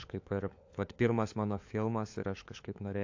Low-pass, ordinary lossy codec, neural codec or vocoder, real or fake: 7.2 kHz; AAC, 48 kbps; codec, 16 kHz, 4 kbps, FunCodec, trained on LibriTTS, 50 frames a second; fake